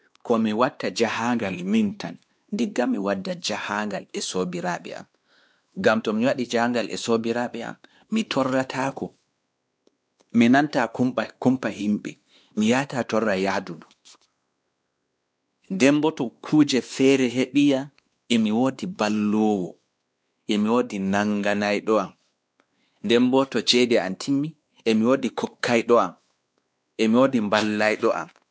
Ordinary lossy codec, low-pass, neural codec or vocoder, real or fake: none; none; codec, 16 kHz, 2 kbps, X-Codec, WavLM features, trained on Multilingual LibriSpeech; fake